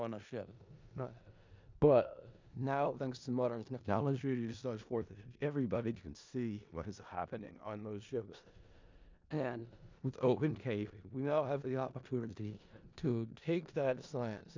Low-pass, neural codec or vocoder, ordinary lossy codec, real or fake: 7.2 kHz; codec, 16 kHz in and 24 kHz out, 0.4 kbps, LongCat-Audio-Codec, four codebook decoder; MP3, 64 kbps; fake